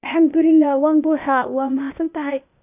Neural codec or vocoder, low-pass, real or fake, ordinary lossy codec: codec, 16 kHz, 0.8 kbps, ZipCodec; 3.6 kHz; fake; none